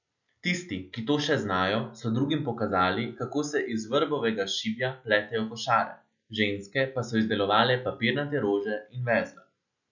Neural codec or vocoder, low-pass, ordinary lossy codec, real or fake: none; 7.2 kHz; none; real